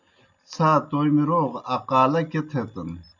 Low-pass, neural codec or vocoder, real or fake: 7.2 kHz; none; real